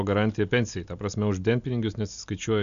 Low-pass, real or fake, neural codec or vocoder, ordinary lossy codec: 7.2 kHz; real; none; AAC, 96 kbps